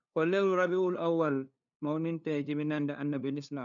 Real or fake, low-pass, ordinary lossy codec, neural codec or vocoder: fake; 7.2 kHz; none; codec, 16 kHz, 2 kbps, FunCodec, trained on LibriTTS, 25 frames a second